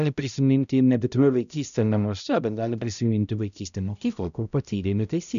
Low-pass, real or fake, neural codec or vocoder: 7.2 kHz; fake; codec, 16 kHz, 0.5 kbps, X-Codec, HuBERT features, trained on balanced general audio